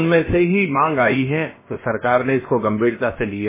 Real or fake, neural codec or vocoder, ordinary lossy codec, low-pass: fake; codec, 16 kHz, about 1 kbps, DyCAST, with the encoder's durations; MP3, 16 kbps; 3.6 kHz